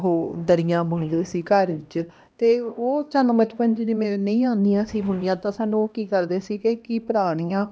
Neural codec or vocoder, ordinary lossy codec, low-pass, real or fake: codec, 16 kHz, 1 kbps, X-Codec, HuBERT features, trained on LibriSpeech; none; none; fake